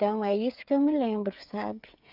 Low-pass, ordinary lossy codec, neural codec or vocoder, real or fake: 5.4 kHz; none; codec, 16 kHz, 8 kbps, FreqCodec, smaller model; fake